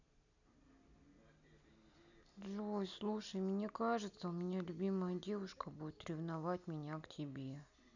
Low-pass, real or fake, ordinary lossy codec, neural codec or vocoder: 7.2 kHz; real; none; none